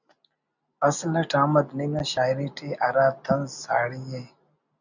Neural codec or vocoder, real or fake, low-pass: none; real; 7.2 kHz